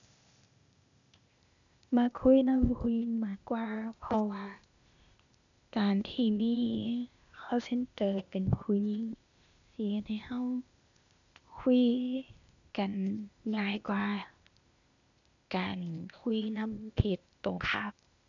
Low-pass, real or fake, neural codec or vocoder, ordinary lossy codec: 7.2 kHz; fake; codec, 16 kHz, 0.8 kbps, ZipCodec; none